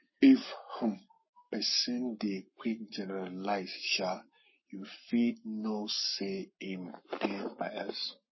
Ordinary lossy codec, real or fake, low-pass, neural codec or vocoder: MP3, 24 kbps; fake; 7.2 kHz; codec, 44.1 kHz, 7.8 kbps, Pupu-Codec